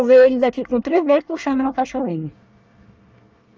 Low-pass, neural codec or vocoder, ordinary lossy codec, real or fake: 7.2 kHz; codec, 24 kHz, 1 kbps, SNAC; Opus, 24 kbps; fake